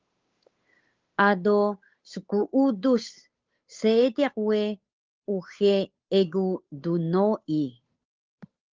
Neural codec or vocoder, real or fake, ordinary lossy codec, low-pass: codec, 16 kHz, 8 kbps, FunCodec, trained on Chinese and English, 25 frames a second; fake; Opus, 32 kbps; 7.2 kHz